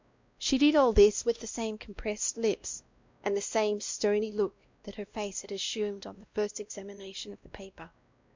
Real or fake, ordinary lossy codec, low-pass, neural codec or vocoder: fake; MP3, 64 kbps; 7.2 kHz; codec, 16 kHz, 1 kbps, X-Codec, WavLM features, trained on Multilingual LibriSpeech